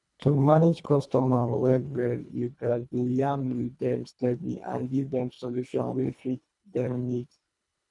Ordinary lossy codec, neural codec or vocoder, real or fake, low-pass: none; codec, 24 kHz, 1.5 kbps, HILCodec; fake; 10.8 kHz